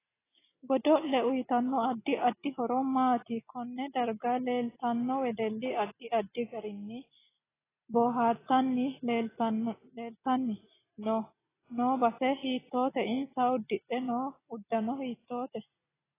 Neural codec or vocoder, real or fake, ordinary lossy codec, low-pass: none; real; AAC, 16 kbps; 3.6 kHz